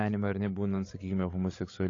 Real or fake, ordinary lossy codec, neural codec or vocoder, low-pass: fake; AAC, 32 kbps; codec, 16 kHz, 16 kbps, FreqCodec, larger model; 7.2 kHz